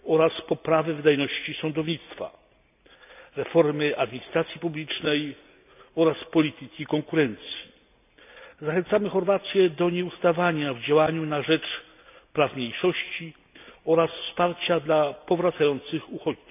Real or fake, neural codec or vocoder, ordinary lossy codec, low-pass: real; none; none; 3.6 kHz